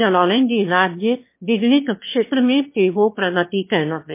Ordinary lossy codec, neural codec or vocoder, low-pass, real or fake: MP3, 24 kbps; autoencoder, 22.05 kHz, a latent of 192 numbers a frame, VITS, trained on one speaker; 3.6 kHz; fake